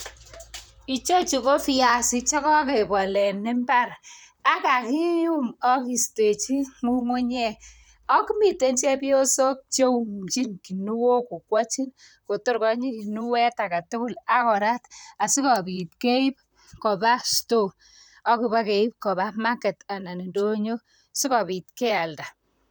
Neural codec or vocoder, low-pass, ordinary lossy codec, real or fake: vocoder, 44.1 kHz, 128 mel bands, Pupu-Vocoder; none; none; fake